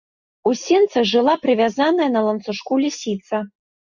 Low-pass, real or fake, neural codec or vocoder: 7.2 kHz; real; none